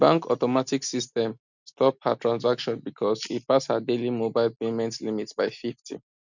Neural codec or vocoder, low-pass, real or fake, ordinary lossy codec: none; 7.2 kHz; real; none